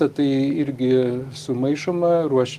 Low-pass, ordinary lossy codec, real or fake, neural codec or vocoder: 14.4 kHz; Opus, 24 kbps; real; none